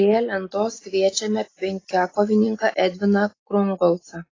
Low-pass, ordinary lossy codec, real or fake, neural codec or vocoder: 7.2 kHz; AAC, 32 kbps; real; none